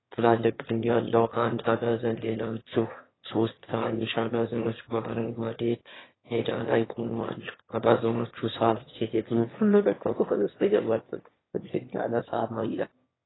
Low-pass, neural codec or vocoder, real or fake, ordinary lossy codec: 7.2 kHz; autoencoder, 22.05 kHz, a latent of 192 numbers a frame, VITS, trained on one speaker; fake; AAC, 16 kbps